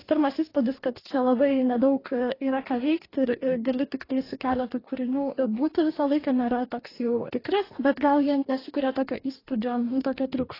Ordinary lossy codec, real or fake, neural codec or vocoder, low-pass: AAC, 24 kbps; fake; codec, 44.1 kHz, 2.6 kbps, DAC; 5.4 kHz